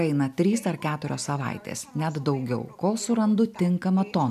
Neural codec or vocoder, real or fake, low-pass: none; real; 14.4 kHz